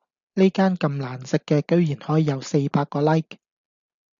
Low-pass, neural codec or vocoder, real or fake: 7.2 kHz; none; real